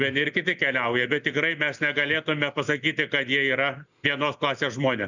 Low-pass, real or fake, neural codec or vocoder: 7.2 kHz; real; none